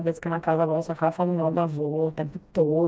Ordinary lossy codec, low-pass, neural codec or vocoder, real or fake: none; none; codec, 16 kHz, 1 kbps, FreqCodec, smaller model; fake